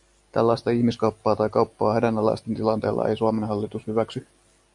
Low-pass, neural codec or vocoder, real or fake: 10.8 kHz; none; real